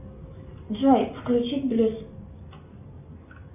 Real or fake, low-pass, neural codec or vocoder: real; 3.6 kHz; none